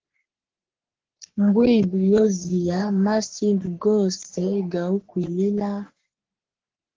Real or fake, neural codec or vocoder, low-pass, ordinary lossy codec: fake; codec, 44.1 kHz, 3.4 kbps, Pupu-Codec; 7.2 kHz; Opus, 16 kbps